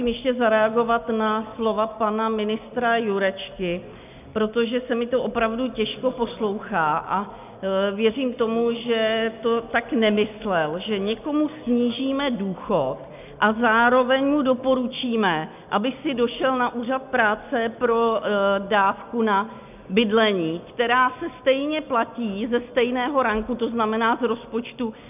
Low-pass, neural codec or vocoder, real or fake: 3.6 kHz; none; real